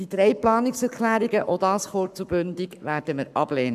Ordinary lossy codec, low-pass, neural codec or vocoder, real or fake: none; 14.4 kHz; codec, 44.1 kHz, 7.8 kbps, Pupu-Codec; fake